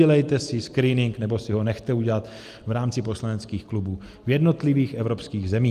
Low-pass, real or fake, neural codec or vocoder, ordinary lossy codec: 14.4 kHz; real; none; Opus, 32 kbps